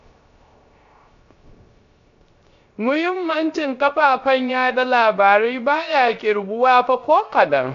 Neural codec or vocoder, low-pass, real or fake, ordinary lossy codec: codec, 16 kHz, 0.3 kbps, FocalCodec; 7.2 kHz; fake; AAC, 64 kbps